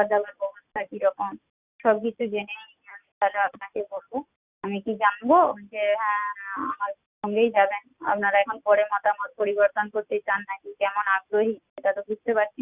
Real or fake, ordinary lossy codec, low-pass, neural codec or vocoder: real; Opus, 64 kbps; 3.6 kHz; none